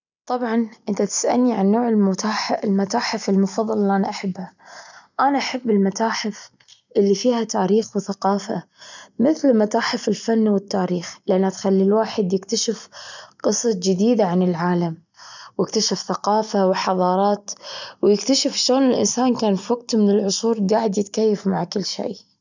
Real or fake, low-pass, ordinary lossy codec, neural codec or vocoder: real; 7.2 kHz; none; none